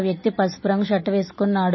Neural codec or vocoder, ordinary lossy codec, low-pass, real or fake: none; MP3, 24 kbps; 7.2 kHz; real